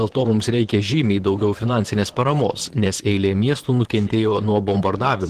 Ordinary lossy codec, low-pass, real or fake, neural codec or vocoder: Opus, 16 kbps; 14.4 kHz; fake; vocoder, 44.1 kHz, 128 mel bands, Pupu-Vocoder